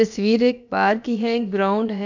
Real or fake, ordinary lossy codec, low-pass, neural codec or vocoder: fake; none; 7.2 kHz; codec, 16 kHz, about 1 kbps, DyCAST, with the encoder's durations